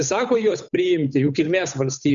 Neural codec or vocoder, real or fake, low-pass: codec, 16 kHz, 8 kbps, FunCodec, trained on Chinese and English, 25 frames a second; fake; 7.2 kHz